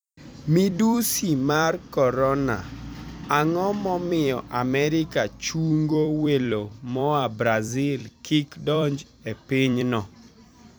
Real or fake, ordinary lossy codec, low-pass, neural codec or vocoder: fake; none; none; vocoder, 44.1 kHz, 128 mel bands every 256 samples, BigVGAN v2